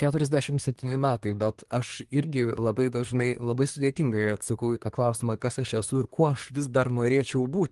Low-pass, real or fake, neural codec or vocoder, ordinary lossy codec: 10.8 kHz; fake; codec, 24 kHz, 1 kbps, SNAC; Opus, 24 kbps